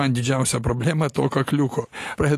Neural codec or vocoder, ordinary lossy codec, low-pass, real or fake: none; AAC, 48 kbps; 14.4 kHz; real